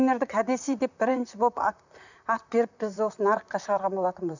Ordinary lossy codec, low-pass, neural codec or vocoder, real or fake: none; 7.2 kHz; vocoder, 44.1 kHz, 128 mel bands, Pupu-Vocoder; fake